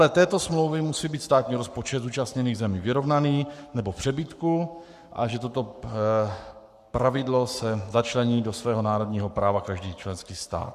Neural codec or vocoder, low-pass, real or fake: codec, 44.1 kHz, 7.8 kbps, Pupu-Codec; 14.4 kHz; fake